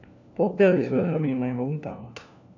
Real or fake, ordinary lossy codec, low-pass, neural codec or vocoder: fake; none; 7.2 kHz; codec, 16 kHz, 1 kbps, FunCodec, trained on LibriTTS, 50 frames a second